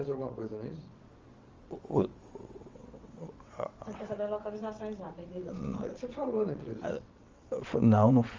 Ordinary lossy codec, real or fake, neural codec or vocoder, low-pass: Opus, 32 kbps; fake; vocoder, 22.05 kHz, 80 mel bands, WaveNeXt; 7.2 kHz